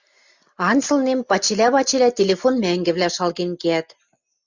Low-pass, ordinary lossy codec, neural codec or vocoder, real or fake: 7.2 kHz; Opus, 64 kbps; none; real